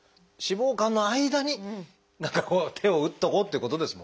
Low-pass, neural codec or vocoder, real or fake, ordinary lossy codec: none; none; real; none